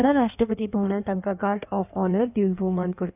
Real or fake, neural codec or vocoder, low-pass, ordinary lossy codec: fake; codec, 16 kHz in and 24 kHz out, 1.1 kbps, FireRedTTS-2 codec; 3.6 kHz; none